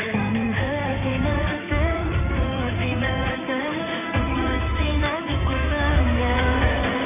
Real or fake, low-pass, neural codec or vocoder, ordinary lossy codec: fake; 3.6 kHz; codec, 16 kHz in and 24 kHz out, 2.2 kbps, FireRedTTS-2 codec; none